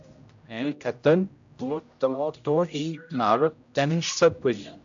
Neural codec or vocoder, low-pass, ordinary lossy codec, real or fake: codec, 16 kHz, 0.5 kbps, X-Codec, HuBERT features, trained on general audio; 7.2 kHz; AAC, 64 kbps; fake